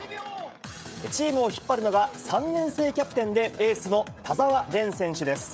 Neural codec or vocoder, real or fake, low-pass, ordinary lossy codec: codec, 16 kHz, 16 kbps, FreqCodec, smaller model; fake; none; none